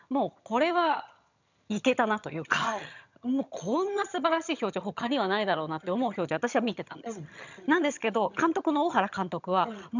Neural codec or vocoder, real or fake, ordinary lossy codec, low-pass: vocoder, 22.05 kHz, 80 mel bands, HiFi-GAN; fake; none; 7.2 kHz